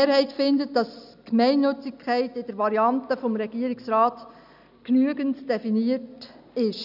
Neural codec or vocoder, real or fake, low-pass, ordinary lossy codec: none; real; 5.4 kHz; none